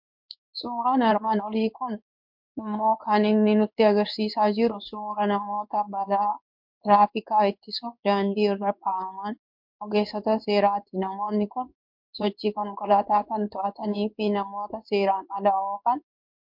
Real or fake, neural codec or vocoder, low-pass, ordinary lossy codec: fake; codec, 16 kHz in and 24 kHz out, 1 kbps, XY-Tokenizer; 5.4 kHz; MP3, 48 kbps